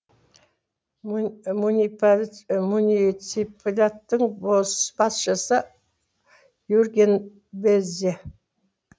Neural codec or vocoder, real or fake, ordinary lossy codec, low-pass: none; real; none; none